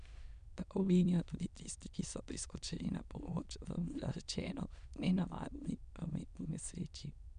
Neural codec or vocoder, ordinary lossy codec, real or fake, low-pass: autoencoder, 22.05 kHz, a latent of 192 numbers a frame, VITS, trained on many speakers; none; fake; 9.9 kHz